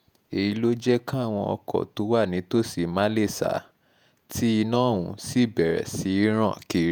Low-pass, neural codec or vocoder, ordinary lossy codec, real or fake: none; vocoder, 48 kHz, 128 mel bands, Vocos; none; fake